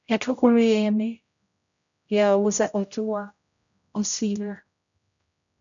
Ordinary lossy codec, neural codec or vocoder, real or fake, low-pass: MP3, 96 kbps; codec, 16 kHz, 0.5 kbps, X-Codec, HuBERT features, trained on general audio; fake; 7.2 kHz